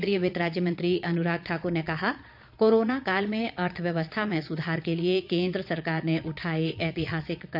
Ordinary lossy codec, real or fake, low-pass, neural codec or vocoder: none; fake; 5.4 kHz; vocoder, 22.05 kHz, 80 mel bands, Vocos